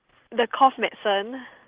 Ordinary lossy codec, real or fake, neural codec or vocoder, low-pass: Opus, 16 kbps; real; none; 3.6 kHz